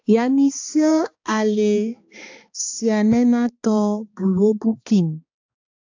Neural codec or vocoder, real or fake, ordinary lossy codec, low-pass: codec, 16 kHz, 2 kbps, X-Codec, HuBERT features, trained on balanced general audio; fake; AAC, 48 kbps; 7.2 kHz